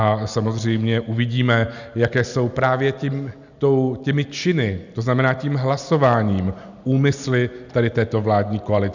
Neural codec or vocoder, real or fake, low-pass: none; real; 7.2 kHz